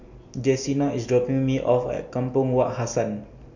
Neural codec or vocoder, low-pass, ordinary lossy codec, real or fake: none; 7.2 kHz; none; real